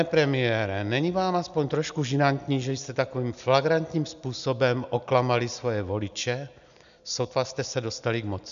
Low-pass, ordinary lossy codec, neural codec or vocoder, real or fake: 7.2 kHz; MP3, 96 kbps; none; real